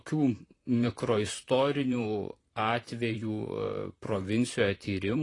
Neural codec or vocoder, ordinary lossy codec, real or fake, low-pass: vocoder, 44.1 kHz, 128 mel bands, Pupu-Vocoder; AAC, 32 kbps; fake; 10.8 kHz